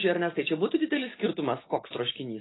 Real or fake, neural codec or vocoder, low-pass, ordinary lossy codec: real; none; 7.2 kHz; AAC, 16 kbps